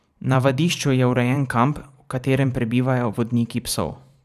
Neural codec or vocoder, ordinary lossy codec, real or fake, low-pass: vocoder, 44.1 kHz, 128 mel bands every 512 samples, BigVGAN v2; none; fake; 14.4 kHz